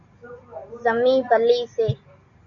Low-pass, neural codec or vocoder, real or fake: 7.2 kHz; none; real